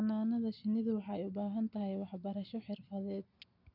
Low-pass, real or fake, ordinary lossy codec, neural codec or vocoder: 5.4 kHz; real; none; none